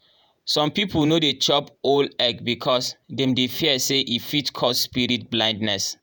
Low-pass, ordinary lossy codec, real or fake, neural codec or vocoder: none; none; fake; vocoder, 48 kHz, 128 mel bands, Vocos